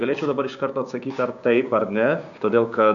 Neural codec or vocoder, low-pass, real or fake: codec, 16 kHz, 6 kbps, DAC; 7.2 kHz; fake